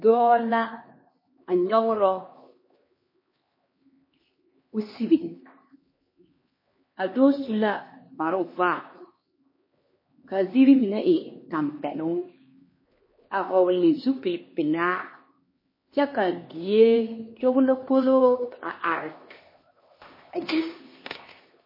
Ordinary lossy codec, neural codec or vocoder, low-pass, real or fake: MP3, 24 kbps; codec, 16 kHz, 2 kbps, X-Codec, HuBERT features, trained on LibriSpeech; 5.4 kHz; fake